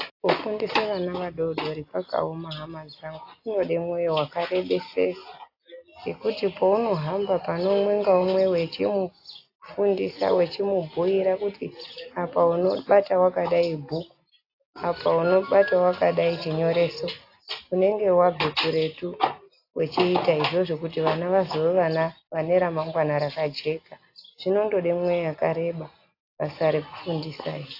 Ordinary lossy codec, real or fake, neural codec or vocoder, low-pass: AAC, 32 kbps; real; none; 5.4 kHz